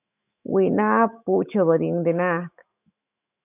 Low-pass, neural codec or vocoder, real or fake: 3.6 kHz; autoencoder, 48 kHz, 128 numbers a frame, DAC-VAE, trained on Japanese speech; fake